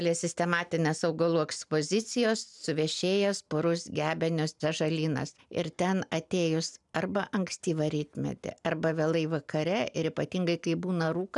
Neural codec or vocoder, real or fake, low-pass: none; real; 10.8 kHz